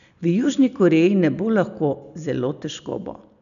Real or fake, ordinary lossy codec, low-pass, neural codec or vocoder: real; none; 7.2 kHz; none